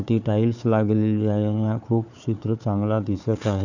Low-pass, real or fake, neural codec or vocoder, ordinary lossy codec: 7.2 kHz; fake; codec, 16 kHz, 4 kbps, FunCodec, trained on Chinese and English, 50 frames a second; none